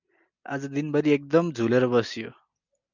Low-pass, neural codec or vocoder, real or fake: 7.2 kHz; none; real